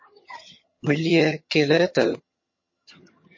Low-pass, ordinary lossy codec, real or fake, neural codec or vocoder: 7.2 kHz; MP3, 32 kbps; fake; vocoder, 22.05 kHz, 80 mel bands, HiFi-GAN